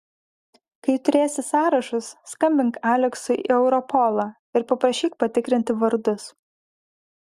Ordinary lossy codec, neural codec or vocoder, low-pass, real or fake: Opus, 64 kbps; none; 14.4 kHz; real